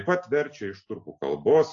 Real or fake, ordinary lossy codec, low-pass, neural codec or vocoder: real; AAC, 48 kbps; 7.2 kHz; none